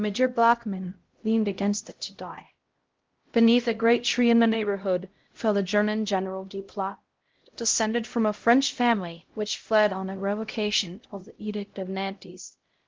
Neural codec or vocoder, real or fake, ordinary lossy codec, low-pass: codec, 16 kHz, 0.5 kbps, X-Codec, HuBERT features, trained on LibriSpeech; fake; Opus, 16 kbps; 7.2 kHz